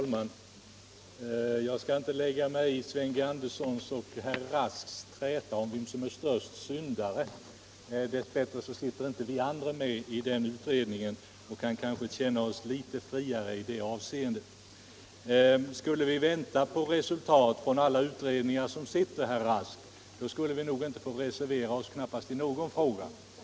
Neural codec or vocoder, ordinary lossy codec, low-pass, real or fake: none; none; none; real